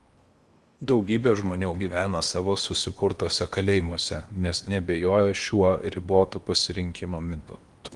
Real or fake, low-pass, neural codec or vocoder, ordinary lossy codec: fake; 10.8 kHz; codec, 16 kHz in and 24 kHz out, 0.8 kbps, FocalCodec, streaming, 65536 codes; Opus, 32 kbps